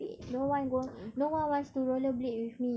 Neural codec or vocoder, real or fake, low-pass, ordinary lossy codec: none; real; none; none